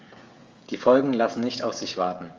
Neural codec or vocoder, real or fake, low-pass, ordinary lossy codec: codec, 16 kHz, 16 kbps, FreqCodec, smaller model; fake; 7.2 kHz; Opus, 32 kbps